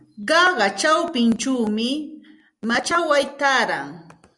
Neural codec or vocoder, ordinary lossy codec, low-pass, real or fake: none; Opus, 64 kbps; 10.8 kHz; real